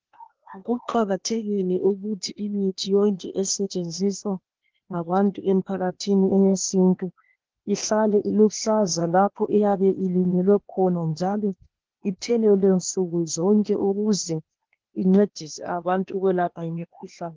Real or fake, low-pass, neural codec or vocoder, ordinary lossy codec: fake; 7.2 kHz; codec, 16 kHz, 0.8 kbps, ZipCodec; Opus, 16 kbps